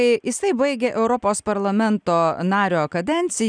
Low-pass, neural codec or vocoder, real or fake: 9.9 kHz; none; real